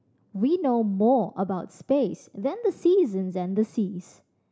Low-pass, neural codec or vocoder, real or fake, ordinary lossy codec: none; none; real; none